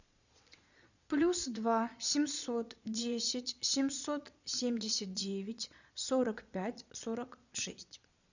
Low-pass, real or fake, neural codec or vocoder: 7.2 kHz; fake; vocoder, 22.05 kHz, 80 mel bands, Vocos